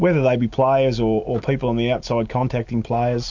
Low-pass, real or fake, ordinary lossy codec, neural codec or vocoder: 7.2 kHz; real; MP3, 48 kbps; none